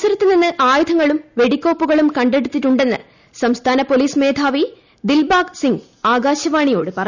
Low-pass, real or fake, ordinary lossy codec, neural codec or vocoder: 7.2 kHz; real; none; none